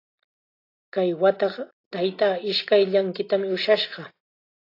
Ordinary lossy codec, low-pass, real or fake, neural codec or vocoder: AAC, 32 kbps; 5.4 kHz; real; none